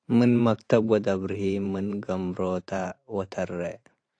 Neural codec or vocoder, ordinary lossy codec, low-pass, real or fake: vocoder, 44.1 kHz, 128 mel bands every 512 samples, BigVGAN v2; AAC, 48 kbps; 9.9 kHz; fake